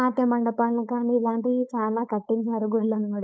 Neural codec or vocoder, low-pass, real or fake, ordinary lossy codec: codec, 16 kHz, 4.8 kbps, FACodec; none; fake; none